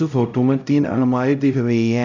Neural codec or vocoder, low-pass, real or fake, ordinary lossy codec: codec, 16 kHz, 0.5 kbps, X-Codec, HuBERT features, trained on LibriSpeech; 7.2 kHz; fake; none